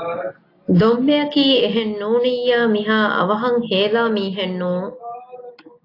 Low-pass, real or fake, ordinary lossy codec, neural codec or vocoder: 5.4 kHz; real; AAC, 32 kbps; none